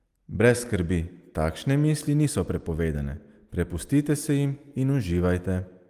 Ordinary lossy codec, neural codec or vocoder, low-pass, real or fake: Opus, 32 kbps; none; 14.4 kHz; real